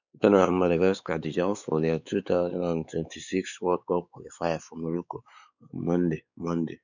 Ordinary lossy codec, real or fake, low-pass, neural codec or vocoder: none; fake; 7.2 kHz; codec, 16 kHz, 4 kbps, X-Codec, WavLM features, trained on Multilingual LibriSpeech